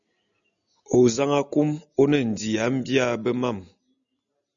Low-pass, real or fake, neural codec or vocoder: 7.2 kHz; real; none